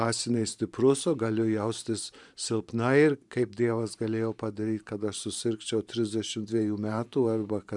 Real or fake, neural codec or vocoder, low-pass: fake; vocoder, 44.1 kHz, 128 mel bands every 512 samples, BigVGAN v2; 10.8 kHz